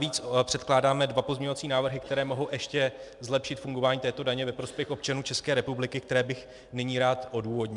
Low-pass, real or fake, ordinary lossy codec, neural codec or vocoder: 10.8 kHz; real; MP3, 96 kbps; none